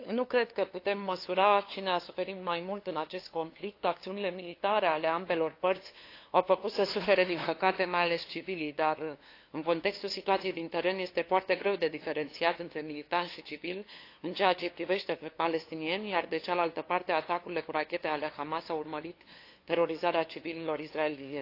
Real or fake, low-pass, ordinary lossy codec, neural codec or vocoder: fake; 5.4 kHz; AAC, 32 kbps; codec, 16 kHz, 2 kbps, FunCodec, trained on LibriTTS, 25 frames a second